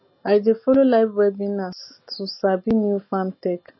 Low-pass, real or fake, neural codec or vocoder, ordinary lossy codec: 7.2 kHz; real; none; MP3, 24 kbps